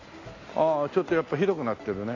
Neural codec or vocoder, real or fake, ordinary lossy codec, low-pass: none; real; AAC, 32 kbps; 7.2 kHz